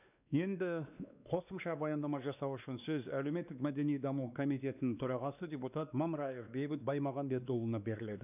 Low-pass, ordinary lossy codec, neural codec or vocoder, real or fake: 3.6 kHz; none; codec, 16 kHz, 2 kbps, X-Codec, WavLM features, trained on Multilingual LibriSpeech; fake